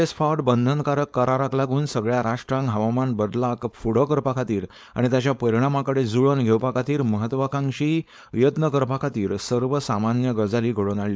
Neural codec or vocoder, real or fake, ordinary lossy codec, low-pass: codec, 16 kHz, 4.8 kbps, FACodec; fake; none; none